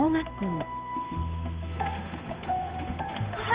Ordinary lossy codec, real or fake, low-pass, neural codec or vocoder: Opus, 32 kbps; fake; 3.6 kHz; codec, 16 kHz, 8 kbps, FunCodec, trained on Chinese and English, 25 frames a second